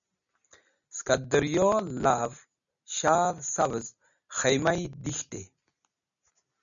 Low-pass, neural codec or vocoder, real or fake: 7.2 kHz; none; real